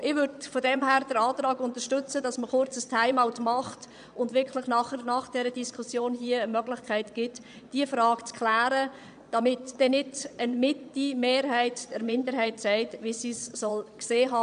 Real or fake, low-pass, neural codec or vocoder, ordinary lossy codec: fake; 9.9 kHz; vocoder, 22.05 kHz, 80 mel bands, Vocos; none